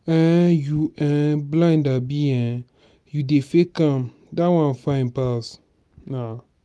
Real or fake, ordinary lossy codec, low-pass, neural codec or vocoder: real; none; none; none